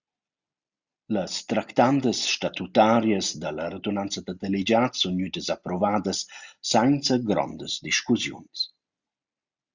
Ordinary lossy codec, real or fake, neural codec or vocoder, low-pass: Opus, 64 kbps; real; none; 7.2 kHz